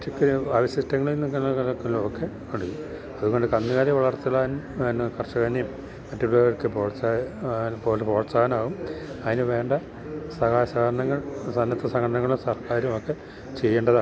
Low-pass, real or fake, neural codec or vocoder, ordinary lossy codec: none; real; none; none